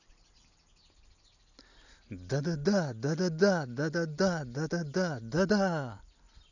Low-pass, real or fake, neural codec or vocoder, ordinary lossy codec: 7.2 kHz; real; none; none